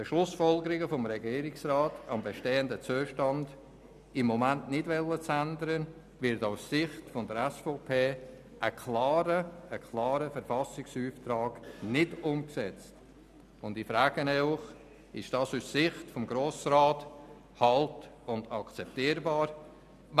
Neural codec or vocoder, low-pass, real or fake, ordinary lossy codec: none; 14.4 kHz; real; none